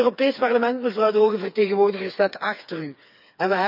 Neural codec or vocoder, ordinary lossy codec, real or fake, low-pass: codec, 16 kHz, 4 kbps, FreqCodec, smaller model; none; fake; 5.4 kHz